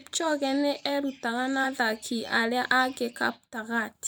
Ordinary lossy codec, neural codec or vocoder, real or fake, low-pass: none; vocoder, 44.1 kHz, 128 mel bands every 512 samples, BigVGAN v2; fake; none